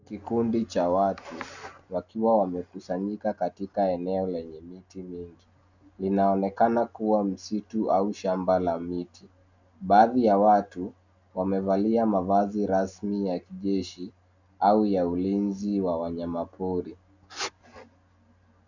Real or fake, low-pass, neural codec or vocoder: real; 7.2 kHz; none